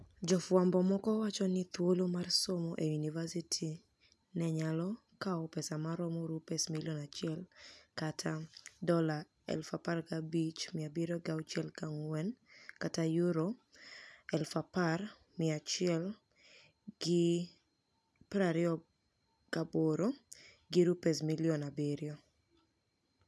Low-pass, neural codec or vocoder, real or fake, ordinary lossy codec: none; none; real; none